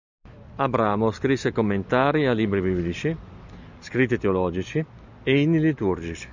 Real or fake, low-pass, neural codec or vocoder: real; 7.2 kHz; none